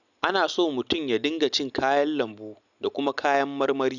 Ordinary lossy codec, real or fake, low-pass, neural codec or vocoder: none; real; 7.2 kHz; none